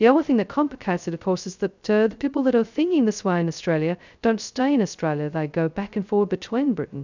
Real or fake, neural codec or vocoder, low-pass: fake; codec, 16 kHz, 0.2 kbps, FocalCodec; 7.2 kHz